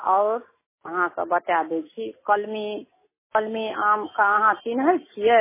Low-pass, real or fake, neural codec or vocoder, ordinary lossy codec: 3.6 kHz; real; none; MP3, 16 kbps